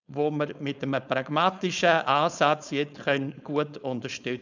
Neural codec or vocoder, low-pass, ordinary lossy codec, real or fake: codec, 16 kHz, 4.8 kbps, FACodec; 7.2 kHz; none; fake